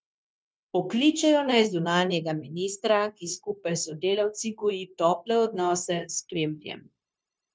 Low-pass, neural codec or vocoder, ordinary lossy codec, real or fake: none; codec, 16 kHz, 0.9 kbps, LongCat-Audio-Codec; none; fake